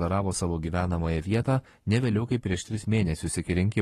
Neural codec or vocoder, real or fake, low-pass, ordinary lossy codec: codec, 44.1 kHz, 7.8 kbps, Pupu-Codec; fake; 19.8 kHz; AAC, 32 kbps